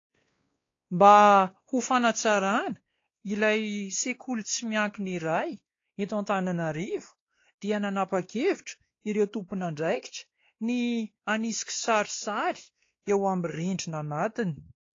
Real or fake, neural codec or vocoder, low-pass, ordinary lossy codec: fake; codec, 16 kHz, 2 kbps, X-Codec, WavLM features, trained on Multilingual LibriSpeech; 7.2 kHz; AAC, 32 kbps